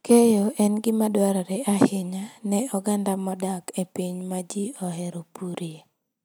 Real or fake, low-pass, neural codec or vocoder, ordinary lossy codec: real; none; none; none